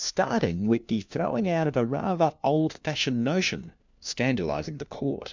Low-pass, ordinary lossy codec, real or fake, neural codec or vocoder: 7.2 kHz; MP3, 64 kbps; fake; codec, 16 kHz, 1 kbps, FunCodec, trained on LibriTTS, 50 frames a second